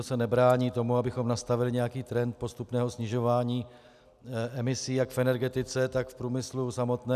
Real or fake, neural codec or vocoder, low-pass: real; none; 14.4 kHz